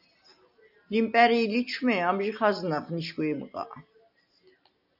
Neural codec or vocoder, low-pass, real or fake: none; 5.4 kHz; real